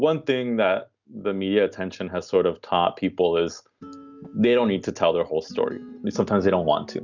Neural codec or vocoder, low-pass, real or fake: none; 7.2 kHz; real